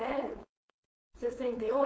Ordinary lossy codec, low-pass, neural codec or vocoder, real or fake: none; none; codec, 16 kHz, 4.8 kbps, FACodec; fake